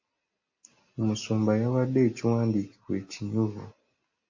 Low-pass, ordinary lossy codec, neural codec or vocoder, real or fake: 7.2 kHz; MP3, 32 kbps; none; real